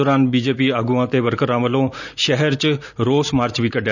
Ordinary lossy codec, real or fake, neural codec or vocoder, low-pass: none; real; none; 7.2 kHz